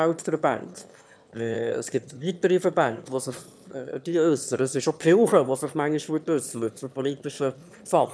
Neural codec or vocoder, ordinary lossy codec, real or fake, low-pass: autoencoder, 22.05 kHz, a latent of 192 numbers a frame, VITS, trained on one speaker; none; fake; none